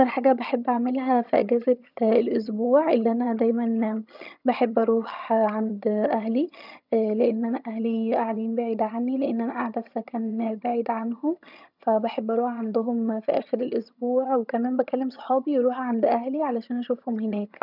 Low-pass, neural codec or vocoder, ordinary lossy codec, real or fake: 5.4 kHz; vocoder, 22.05 kHz, 80 mel bands, HiFi-GAN; none; fake